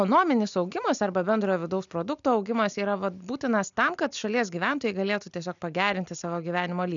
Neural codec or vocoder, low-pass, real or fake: none; 7.2 kHz; real